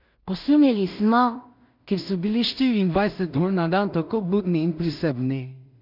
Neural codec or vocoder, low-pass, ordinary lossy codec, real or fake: codec, 16 kHz in and 24 kHz out, 0.4 kbps, LongCat-Audio-Codec, two codebook decoder; 5.4 kHz; none; fake